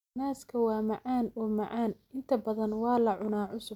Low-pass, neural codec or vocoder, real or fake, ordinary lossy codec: 19.8 kHz; none; real; none